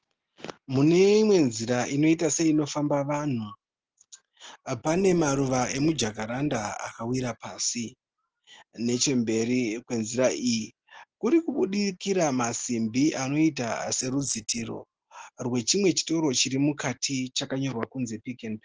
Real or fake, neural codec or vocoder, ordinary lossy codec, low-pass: real; none; Opus, 16 kbps; 7.2 kHz